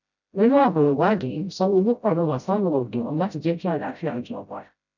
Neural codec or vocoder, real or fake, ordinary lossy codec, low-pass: codec, 16 kHz, 0.5 kbps, FreqCodec, smaller model; fake; none; 7.2 kHz